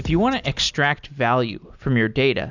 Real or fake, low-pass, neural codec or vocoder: real; 7.2 kHz; none